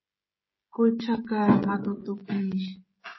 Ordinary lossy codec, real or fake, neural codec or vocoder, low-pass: MP3, 24 kbps; fake; codec, 16 kHz, 16 kbps, FreqCodec, smaller model; 7.2 kHz